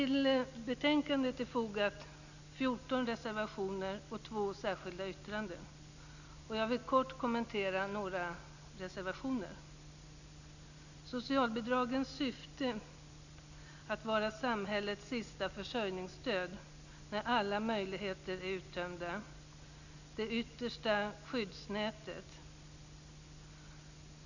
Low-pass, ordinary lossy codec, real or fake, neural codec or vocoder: 7.2 kHz; none; real; none